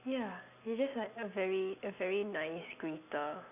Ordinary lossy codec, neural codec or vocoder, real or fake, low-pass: none; none; real; 3.6 kHz